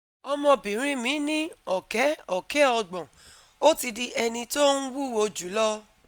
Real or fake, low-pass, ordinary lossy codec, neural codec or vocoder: real; none; none; none